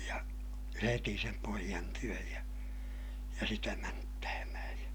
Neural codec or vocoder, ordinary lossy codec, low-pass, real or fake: none; none; none; real